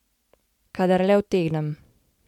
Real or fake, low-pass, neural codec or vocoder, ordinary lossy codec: real; 19.8 kHz; none; MP3, 96 kbps